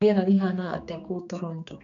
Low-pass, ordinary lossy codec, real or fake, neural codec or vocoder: 7.2 kHz; none; fake; codec, 16 kHz, 2 kbps, X-Codec, HuBERT features, trained on general audio